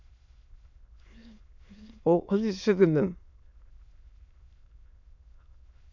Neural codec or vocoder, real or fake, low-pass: autoencoder, 22.05 kHz, a latent of 192 numbers a frame, VITS, trained on many speakers; fake; 7.2 kHz